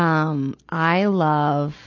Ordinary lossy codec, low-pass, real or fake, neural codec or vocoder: AAC, 48 kbps; 7.2 kHz; real; none